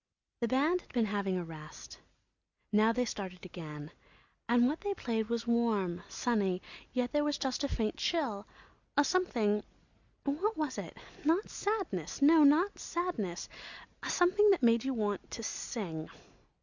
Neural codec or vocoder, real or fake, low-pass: none; real; 7.2 kHz